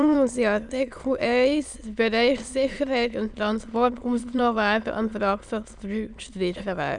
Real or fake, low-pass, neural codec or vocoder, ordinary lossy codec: fake; 9.9 kHz; autoencoder, 22.05 kHz, a latent of 192 numbers a frame, VITS, trained on many speakers; none